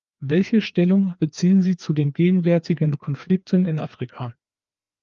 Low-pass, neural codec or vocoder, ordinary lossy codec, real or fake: 7.2 kHz; codec, 16 kHz, 2 kbps, FreqCodec, larger model; Opus, 32 kbps; fake